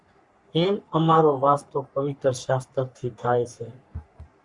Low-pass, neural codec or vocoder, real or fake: 10.8 kHz; codec, 44.1 kHz, 3.4 kbps, Pupu-Codec; fake